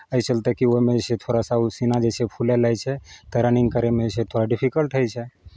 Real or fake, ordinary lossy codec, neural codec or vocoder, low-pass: real; none; none; none